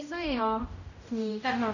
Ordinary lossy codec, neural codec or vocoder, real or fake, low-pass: none; codec, 16 kHz, 0.5 kbps, X-Codec, HuBERT features, trained on general audio; fake; 7.2 kHz